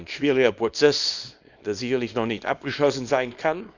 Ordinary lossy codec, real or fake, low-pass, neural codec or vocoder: Opus, 64 kbps; fake; 7.2 kHz; codec, 24 kHz, 0.9 kbps, WavTokenizer, small release